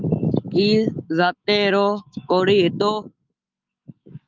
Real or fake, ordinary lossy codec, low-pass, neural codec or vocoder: fake; Opus, 32 kbps; 7.2 kHz; autoencoder, 48 kHz, 128 numbers a frame, DAC-VAE, trained on Japanese speech